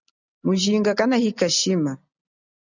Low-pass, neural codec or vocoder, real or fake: 7.2 kHz; none; real